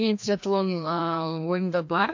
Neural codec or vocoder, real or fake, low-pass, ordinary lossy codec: codec, 16 kHz, 1 kbps, FreqCodec, larger model; fake; 7.2 kHz; MP3, 48 kbps